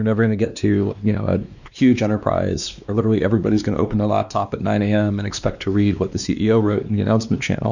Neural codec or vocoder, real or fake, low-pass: codec, 16 kHz, 2 kbps, X-Codec, WavLM features, trained on Multilingual LibriSpeech; fake; 7.2 kHz